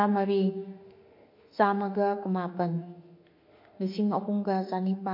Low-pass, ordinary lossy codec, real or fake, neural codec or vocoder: 5.4 kHz; MP3, 32 kbps; fake; autoencoder, 48 kHz, 32 numbers a frame, DAC-VAE, trained on Japanese speech